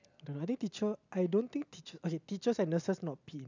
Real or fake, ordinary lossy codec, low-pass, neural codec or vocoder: real; none; 7.2 kHz; none